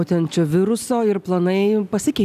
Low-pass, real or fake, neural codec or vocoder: 14.4 kHz; real; none